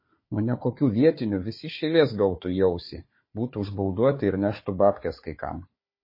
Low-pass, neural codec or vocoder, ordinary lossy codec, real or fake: 5.4 kHz; codec, 16 kHz, 4 kbps, FunCodec, trained on Chinese and English, 50 frames a second; MP3, 24 kbps; fake